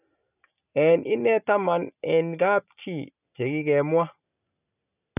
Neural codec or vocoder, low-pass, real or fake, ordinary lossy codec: none; 3.6 kHz; real; none